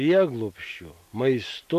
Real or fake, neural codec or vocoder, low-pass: real; none; 14.4 kHz